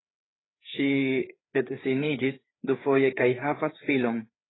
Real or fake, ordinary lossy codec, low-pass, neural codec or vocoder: fake; AAC, 16 kbps; 7.2 kHz; codec, 16 kHz, 4 kbps, FreqCodec, larger model